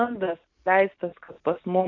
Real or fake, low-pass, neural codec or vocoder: real; 7.2 kHz; none